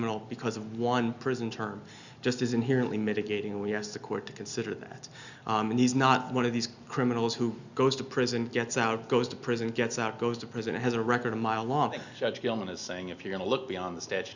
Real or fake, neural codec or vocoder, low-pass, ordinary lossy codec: real; none; 7.2 kHz; Opus, 64 kbps